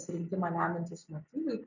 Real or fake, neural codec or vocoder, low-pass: real; none; 7.2 kHz